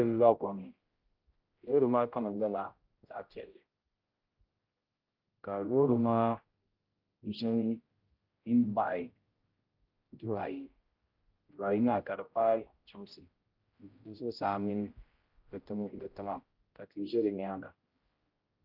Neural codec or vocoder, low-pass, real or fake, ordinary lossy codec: codec, 16 kHz, 0.5 kbps, X-Codec, HuBERT features, trained on general audio; 5.4 kHz; fake; Opus, 32 kbps